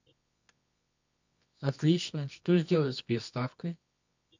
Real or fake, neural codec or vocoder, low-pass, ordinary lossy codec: fake; codec, 24 kHz, 0.9 kbps, WavTokenizer, medium music audio release; 7.2 kHz; MP3, 64 kbps